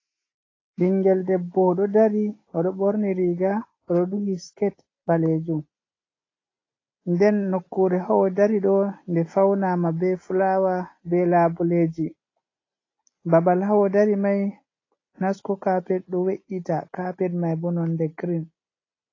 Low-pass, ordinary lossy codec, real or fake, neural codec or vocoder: 7.2 kHz; AAC, 32 kbps; real; none